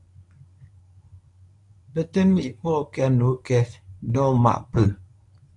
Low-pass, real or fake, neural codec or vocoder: 10.8 kHz; fake; codec, 24 kHz, 0.9 kbps, WavTokenizer, medium speech release version 1